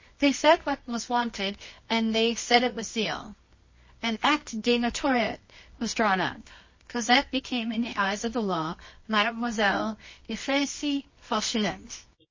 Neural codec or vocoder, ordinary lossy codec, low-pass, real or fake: codec, 24 kHz, 0.9 kbps, WavTokenizer, medium music audio release; MP3, 32 kbps; 7.2 kHz; fake